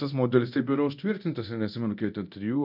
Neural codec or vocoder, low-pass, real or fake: codec, 24 kHz, 0.9 kbps, DualCodec; 5.4 kHz; fake